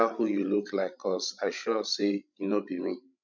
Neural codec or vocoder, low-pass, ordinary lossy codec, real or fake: codec, 16 kHz, 16 kbps, FreqCodec, larger model; 7.2 kHz; none; fake